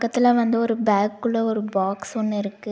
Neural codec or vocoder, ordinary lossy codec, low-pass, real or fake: none; none; none; real